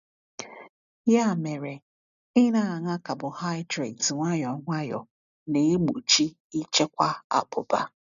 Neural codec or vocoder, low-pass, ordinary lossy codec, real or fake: none; 7.2 kHz; none; real